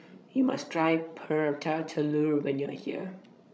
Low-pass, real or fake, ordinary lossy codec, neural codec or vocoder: none; fake; none; codec, 16 kHz, 16 kbps, FreqCodec, larger model